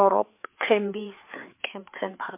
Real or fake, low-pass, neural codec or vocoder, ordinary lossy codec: fake; 3.6 kHz; codec, 16 kHz, 4 kbps, FreqCodec, larger model; MP3, 24 kbps